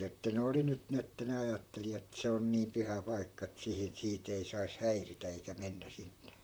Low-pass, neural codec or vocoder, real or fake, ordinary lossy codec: none; vocoder, 44.1 kHz, 128 mel bands, Pupu-Vocoder; fake; none